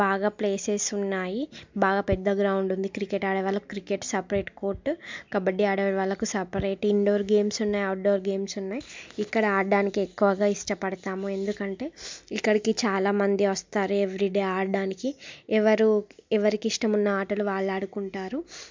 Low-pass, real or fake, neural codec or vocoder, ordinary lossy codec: 7.2 kHz; real; none; MP3, 64 kbps